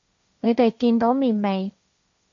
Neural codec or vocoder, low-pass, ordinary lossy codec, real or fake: codec, 16 kHz, 1.1 kbps, Voila-Tokenizer; 7.2 kHz; AAC, 64 kbps; fake